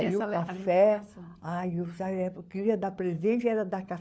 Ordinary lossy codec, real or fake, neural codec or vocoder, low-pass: none; fake; codec, 16 kHz, 4 kbps, FunCodec, trained on LibriTTS, 50 frames a second; none